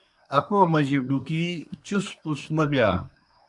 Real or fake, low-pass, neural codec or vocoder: fake; 10.8 kHz; codec, 24 kHz, 1 kbps, SNAC